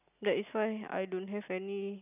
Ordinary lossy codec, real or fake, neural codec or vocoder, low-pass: none; real; none; 3.6 kHz